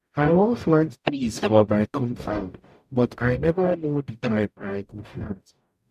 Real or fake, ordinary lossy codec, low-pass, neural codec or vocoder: fake; MP3, 96 kbps; 14.4 kHz; codec, 44.1 kHz, 0.9 kbps, DAC